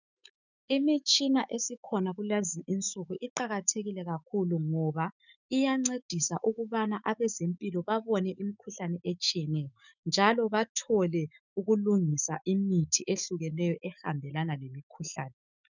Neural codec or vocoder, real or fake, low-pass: autoencoder, 48 kHz, 128 numbers a frame, DAC-VAE, trained on Japanese speech; fake; 7.2 kHz